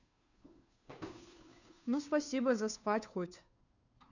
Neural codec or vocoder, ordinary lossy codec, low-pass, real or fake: codec, 16 kHz, 2 kbps, FunCodec, trained on Chinese and English, 25 frames a second; none; 7.2 kHz; fake